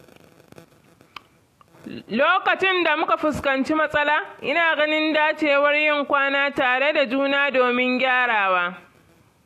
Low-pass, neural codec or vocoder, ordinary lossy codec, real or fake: 14.4 kHz; none; AAC, 64 kbps; real